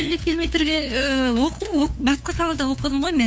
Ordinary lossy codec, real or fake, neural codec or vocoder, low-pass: none; fake; codec, 16 kHz, 2 kbps, FunCodec, trained on LibriTTS, 25 frames a second; none